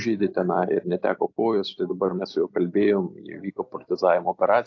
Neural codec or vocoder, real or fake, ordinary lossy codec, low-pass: none; real; AAC, 48 kbps; 7.2 kHz